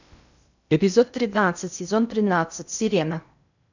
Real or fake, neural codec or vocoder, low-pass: fake; codec, 16 kHz in and 24 kHz out, 0.8 kbps, FocalCodec, streaming, 65536 codes; 7.2 kHz